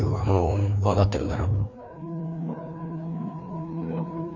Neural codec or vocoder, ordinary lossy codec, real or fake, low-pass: codec, 16 kHz, 2 kbps, FreqCodec, larger model; none; fake; 7.2 kHz